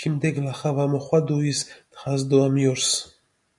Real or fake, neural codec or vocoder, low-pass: real; none; 10.8 kHz